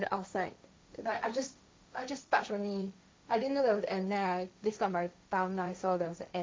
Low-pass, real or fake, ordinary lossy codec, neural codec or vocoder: none; fake; none; codec, 16 kHz, 1.1 kbps, Voila-Tokenizer